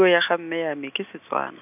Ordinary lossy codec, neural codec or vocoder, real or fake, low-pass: none; none; real; 3.6 kHz